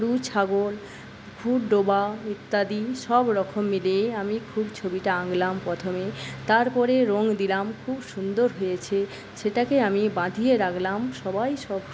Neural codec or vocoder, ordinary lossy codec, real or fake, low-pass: none; none; real; none